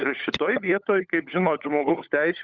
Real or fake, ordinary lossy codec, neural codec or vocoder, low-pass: fake; Opus, 64 kbps; codec, 16 kHz, 16 kbps, FunCodec, trained on LibriTTS, 50 frames a second; 7.2 kHz